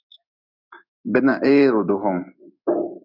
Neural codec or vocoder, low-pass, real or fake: codec, 16 kHz in and 24 kHz out, 1 kbps, XY-Tokenizer; 5.4 kHz; fake